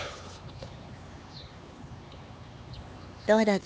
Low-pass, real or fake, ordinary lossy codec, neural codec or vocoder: none; fake; none; codec, 16 kHz, 4 kbps, X-Codec, HuBERT features, trained on LibriSpeech